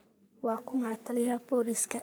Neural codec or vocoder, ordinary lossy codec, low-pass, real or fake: codec, 44.1 kHz, 3.4 kbps, Pupu-Codec; none; none; fake